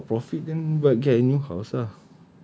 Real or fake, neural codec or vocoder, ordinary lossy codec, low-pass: real; none; none; none